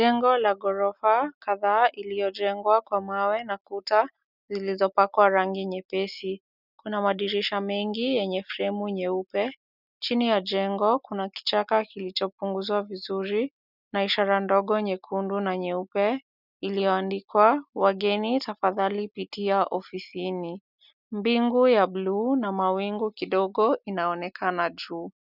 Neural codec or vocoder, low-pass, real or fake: none; 5.4 kHz; real